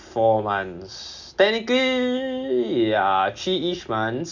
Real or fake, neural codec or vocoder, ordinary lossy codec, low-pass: real; none; none; 7.2 kHz